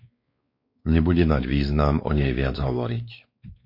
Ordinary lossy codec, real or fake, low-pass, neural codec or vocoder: MP3, 32 kbps; fake; 5.4 kHz; codec, 16 kHz, 4 kbps, X-Codec, WavLM features, trained on Multilingual LibriSpeech